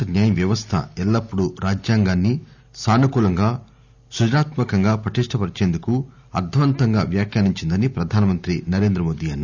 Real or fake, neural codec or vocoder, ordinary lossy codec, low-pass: real; none; none; 7.2 kHz